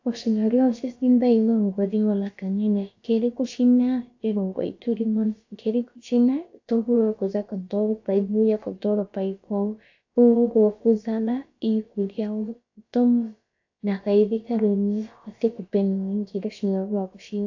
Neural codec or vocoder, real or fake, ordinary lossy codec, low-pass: codec, 16 kHz, about 1 kbps, DyCAST, with the encoder's durations; fake; AAC, 48 kbps; 7.2 kHz